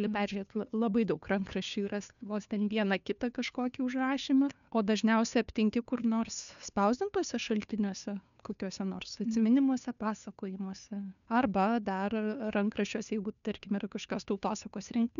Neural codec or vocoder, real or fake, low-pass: codec, 16 kHz, 2 kbps, FunCodec, trained on Chinese and English, 25 frames a second; fake; 7.2 kHz